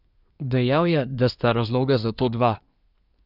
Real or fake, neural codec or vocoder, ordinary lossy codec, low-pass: fake; codec, 24 kHz, 1 kbps, SNAC; none; 5.4 kHz